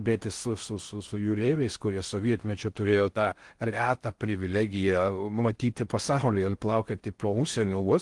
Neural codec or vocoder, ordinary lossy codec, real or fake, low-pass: codec, 16 kHz in and 24 kHz out, 0.6 kbps, FocalCodec, streaming, 4096 codes; Opus, 24 kbps; fake; 10.8 kHz